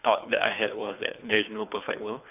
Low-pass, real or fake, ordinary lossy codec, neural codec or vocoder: 3.6 kHz; fake; none; codec, 24 kHz, 3 kbps, HILCodec